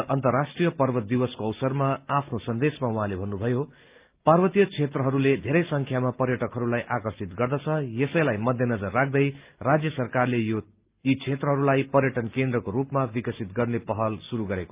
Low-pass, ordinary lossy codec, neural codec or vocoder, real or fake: 3.6 kHz; Opus, 24 kbps; none; real